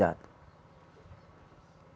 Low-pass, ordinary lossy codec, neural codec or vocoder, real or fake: none; none; none; real